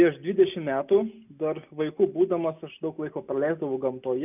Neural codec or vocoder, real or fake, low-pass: none; real; 3.6 kHz